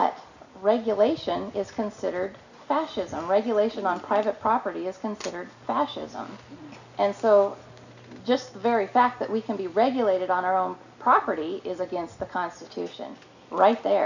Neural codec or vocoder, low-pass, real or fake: none; 7.2 kHz; real